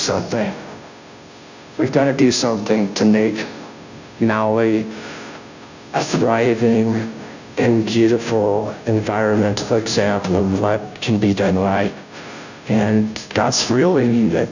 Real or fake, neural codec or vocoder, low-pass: fake; codec, 16 kHz, 0.5 kbps, FunCodec, trained on Chinese and English, 25 frames a second; 7.2 kHz